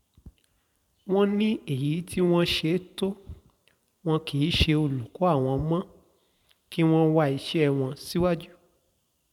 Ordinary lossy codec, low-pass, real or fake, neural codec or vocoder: none; 19.8 kHz; fake; vocoder, 44.1 kHz, 128 mel bands every 512 samples, BigVGAN v2